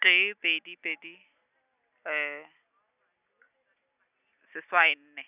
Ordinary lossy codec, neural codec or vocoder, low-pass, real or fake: none; none; 3.6 kHz; real